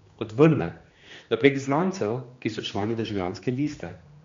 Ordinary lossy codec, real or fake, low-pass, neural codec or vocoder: MP3, 48 kbps; fake; 7.2 kHz; codec, 16 kHz, 2 kbps, X-Codec, HuBERT features, trained on general audio